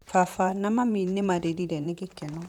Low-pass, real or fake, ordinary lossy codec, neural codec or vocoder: 19.8 kHz; fake; none; vocoder, 44.1 kHz, 128 mel bands, Pupu-Vocoder